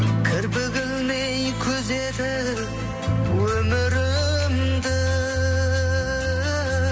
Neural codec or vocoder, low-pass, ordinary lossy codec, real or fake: none; none; none; real